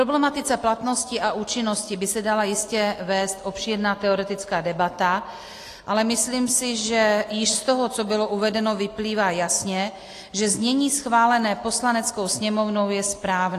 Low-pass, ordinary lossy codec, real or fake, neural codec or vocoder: 14.4 kHz; AAC, 48 kbps; real; none